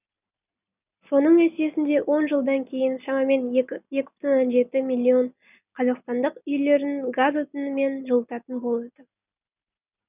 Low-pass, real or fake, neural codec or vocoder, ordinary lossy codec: 3.6 kHz; real; none; none